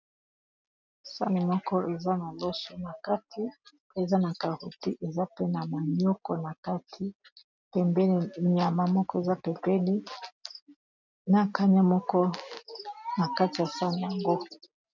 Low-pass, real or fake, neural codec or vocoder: 7.2 kHz; real; none